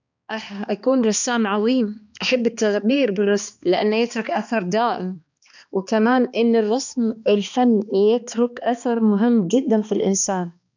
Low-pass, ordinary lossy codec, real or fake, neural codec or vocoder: 7.2 kHz; none; fake; codec, 16 kHz, 2 kbps, X-Codec, HuBERT features, trained on balanced general audio